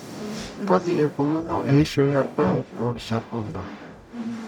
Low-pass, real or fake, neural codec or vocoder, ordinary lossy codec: 19.8 kHz; fake; codec, 44.1 kHz, 0.9 kbps, DAC; none